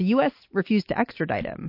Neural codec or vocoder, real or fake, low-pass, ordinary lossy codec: none; real; 5.4 kHz; MP3, 32 kbps